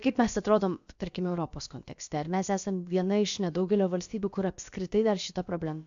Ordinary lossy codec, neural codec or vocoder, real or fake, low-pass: AAC, 64 kbps; codec, 16 kHz, about 1 kbps, DyCAST, with the encoder's durations; fake; 7.2 kHz